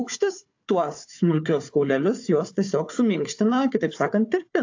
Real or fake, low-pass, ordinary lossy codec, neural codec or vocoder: fake; 7.2 kHz; AAC, 48 kbps; codec, 16 kHz, 4 kbps, FunCodec, trained on Chinese and English, 50 frames a second